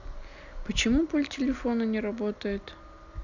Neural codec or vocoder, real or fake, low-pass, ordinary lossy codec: none; real; 7.2 kHz; none